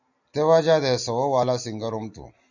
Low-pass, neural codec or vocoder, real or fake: 7.2 kHz; none; real